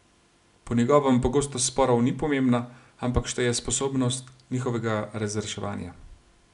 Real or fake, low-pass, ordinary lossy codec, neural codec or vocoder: real; 10.8 kHz; none; none